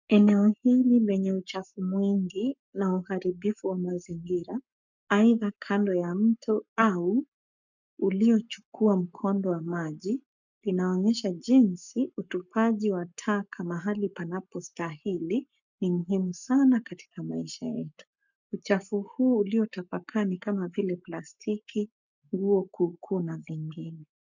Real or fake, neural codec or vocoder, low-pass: fake; codec, 44.1 kHz, 7.8 kbps, Pupu-Codec; 7.2 kHz